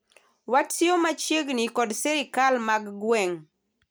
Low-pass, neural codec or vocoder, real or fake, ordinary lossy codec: none; none; real; none